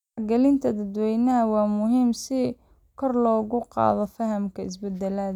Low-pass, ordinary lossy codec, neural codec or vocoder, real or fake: 19.8 kHz; none; none; real